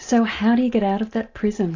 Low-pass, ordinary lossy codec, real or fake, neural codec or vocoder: 7.2 kHz; AAC, 48 kbps; real; none